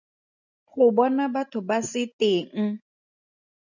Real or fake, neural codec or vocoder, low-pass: real; none; 7.2 kHz